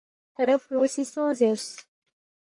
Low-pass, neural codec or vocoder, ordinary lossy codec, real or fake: 10.8 kHz; codec, 44.1 kHz, 1.7 kbps, Pupu-Codec; MP3, 48 kbps; fake